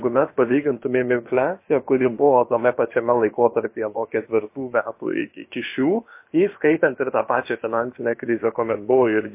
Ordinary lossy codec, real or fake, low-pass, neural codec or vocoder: MP3, 24 kbps; fake; 3.6 kHz; codec, 16 kHz, about 1 kbps, DyCAST, with the encoder's durations